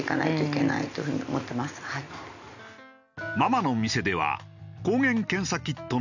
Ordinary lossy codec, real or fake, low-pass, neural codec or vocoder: none; real; 7.2 kHz; none